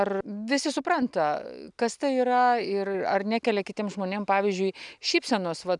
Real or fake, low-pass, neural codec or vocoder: real; 10.8 kHz; none